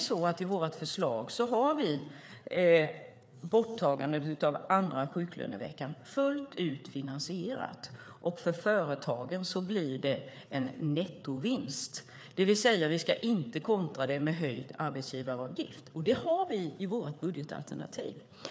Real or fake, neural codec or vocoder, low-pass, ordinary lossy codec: fake; codec, 16 kHz, 4 kbps, FreqCodec, larger model; none; none